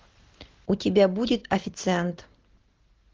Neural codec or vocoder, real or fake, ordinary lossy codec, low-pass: none; real; Opus, 16 kbps; 7.2 kHz